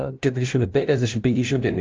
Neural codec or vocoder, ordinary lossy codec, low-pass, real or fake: codec, 16 kHz, 0.5 kbps, FunCodec, trained on LibriTTS, 25 frames a second; Opus, 24 kbps; 7.2 kHz; fake